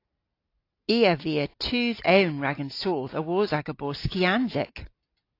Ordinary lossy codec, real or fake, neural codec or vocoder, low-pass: AAC, 32 kbps; real; none; 5.4 kHz